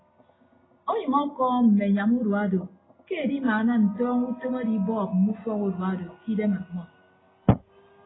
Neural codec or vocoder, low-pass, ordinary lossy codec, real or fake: none; 7.2 kHz; AAC, 16 kbps; real